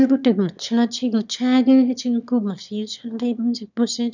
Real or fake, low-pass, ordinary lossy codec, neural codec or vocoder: fake; 7.2 kHz; none; autoencoder, 22.05 kHz, a latent of 192 numbers a frame, VITS, trained on one speaker